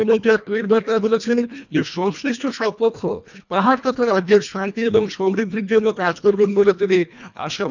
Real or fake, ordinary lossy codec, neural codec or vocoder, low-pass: fake; none; codec, 24 kHz, 1.5 kbps, HILCodec; 7.2 kHz